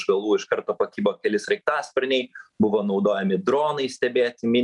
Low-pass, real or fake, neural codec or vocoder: 10.8 kHz; real; none